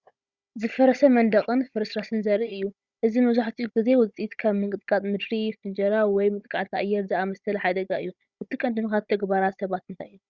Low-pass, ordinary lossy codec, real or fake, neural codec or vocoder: 7.2 kHz; Opus, 64 kbps; fake; codec, 16 kHz, 16 kbps, FunCodec, trained on Chinese and English, 50 frames a second